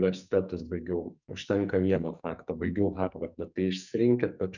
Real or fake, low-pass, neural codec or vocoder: fake; 7.2 kHz; codec, 44.1 kHz, 2.6 kbps, SNAC